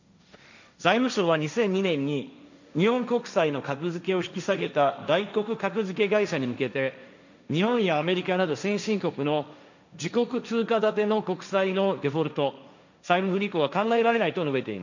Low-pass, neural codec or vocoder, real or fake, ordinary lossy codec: 7.2 kHz; codec, 16 kHz, 1.1 kbps, Voila-Tokenizer; fake; none